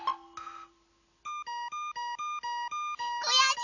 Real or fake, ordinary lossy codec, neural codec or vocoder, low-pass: real; none; none; 7.2 kHz